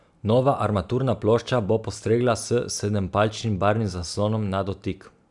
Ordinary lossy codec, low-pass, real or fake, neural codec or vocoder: none; 10.8 kHz; real; none